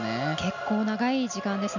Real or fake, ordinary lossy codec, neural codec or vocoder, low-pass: real; none; none; 7.2 kHz